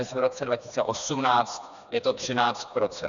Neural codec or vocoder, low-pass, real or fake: codec, 16 kHz, 2 kbps, FreqCodec, smaller model; 7.2 kHz; fake